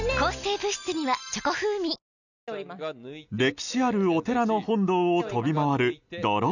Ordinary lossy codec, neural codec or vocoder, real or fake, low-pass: none; none; real; 7.2 kHz